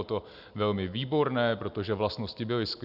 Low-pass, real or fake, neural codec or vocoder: 5.4 kHz; real; none